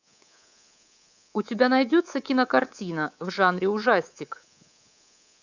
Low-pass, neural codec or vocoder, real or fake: 7.2 kHz; codec, 24 kHz, 3.1 kbps, DualCodec; fake